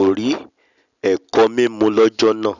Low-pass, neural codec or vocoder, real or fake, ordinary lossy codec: 7.2 kHz; none; real; none